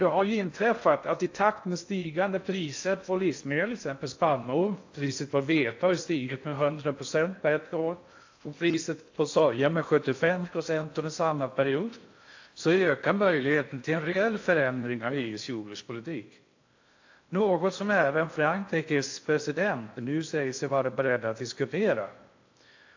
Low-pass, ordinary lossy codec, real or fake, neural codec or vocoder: 7.2 kHz; AAC, 48 kbps; fake; codec, 16 kHz in and 24 kHz out, 0.6 kbps, FocalCodec, streaming, 4096 codes